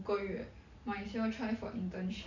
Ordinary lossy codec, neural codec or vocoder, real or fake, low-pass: none; none; real; 7.2 kHz